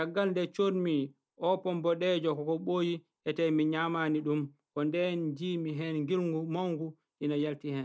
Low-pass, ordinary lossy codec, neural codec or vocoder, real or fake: none; none; none; real